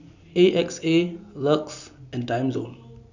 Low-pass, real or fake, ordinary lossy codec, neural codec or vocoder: 7.2 kHz; real; none; none